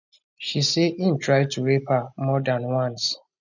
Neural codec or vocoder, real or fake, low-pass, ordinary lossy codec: none; real; 7.2 kHz; none